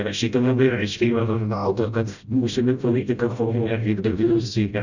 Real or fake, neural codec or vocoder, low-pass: fake; codec, 16 kHz, 0.5 kbps, FreqCodec, smaller model; 7.2 kHz